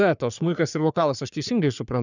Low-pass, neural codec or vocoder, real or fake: 7.2 kHz; codec, 44.1 kHz, 3.4 kbps, Pupu-Codec; fake